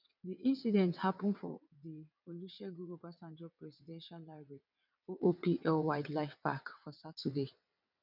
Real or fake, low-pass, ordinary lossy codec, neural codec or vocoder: fake; 5.4 kHz; none; vocoder, 22.05 kHz, 80 mel bands, WaveNeXt